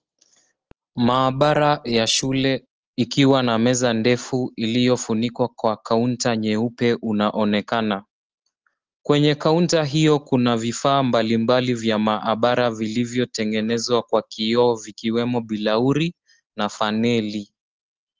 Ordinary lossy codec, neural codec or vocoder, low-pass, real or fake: Opus, 16 kbps; none; 7.2 kHz; real